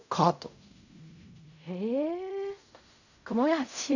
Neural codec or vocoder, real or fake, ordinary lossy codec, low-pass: codec, 16 kHz in and 24 kHz out, 0.4 kbps, LongCat-Audio-Codec, fine tuned four codebook decoder; fake; none; 7.2 kHz